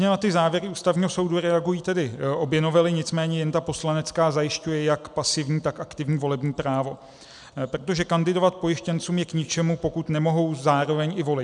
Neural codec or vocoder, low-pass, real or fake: none; 10.8 kHz; real